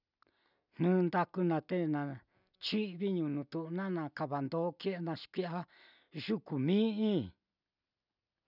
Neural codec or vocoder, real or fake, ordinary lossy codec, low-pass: none; real; none; 5.4 kHz